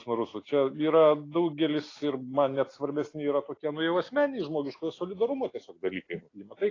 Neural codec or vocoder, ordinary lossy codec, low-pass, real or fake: none; AAC, 32 kbps; 7.2 kHz; real